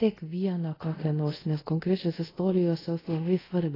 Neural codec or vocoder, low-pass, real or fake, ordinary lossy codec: codec, 24 kHz, 0.5 kbps, DualCodec; 5.4 kHz; fake; AAC, 24 kbps